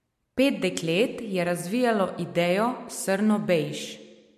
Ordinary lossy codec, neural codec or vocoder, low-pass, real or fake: MP3, 64 kbps; none; 14.4 kHz; real